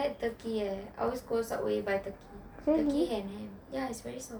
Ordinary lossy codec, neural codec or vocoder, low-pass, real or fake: none; none; none; real